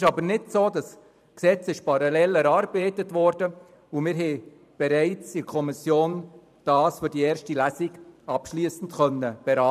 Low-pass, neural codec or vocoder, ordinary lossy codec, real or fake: 14.4 kHz; vocoder, 44.1 kHz, 128 mel bands every 512 samples, BigVGAN v2; none; fake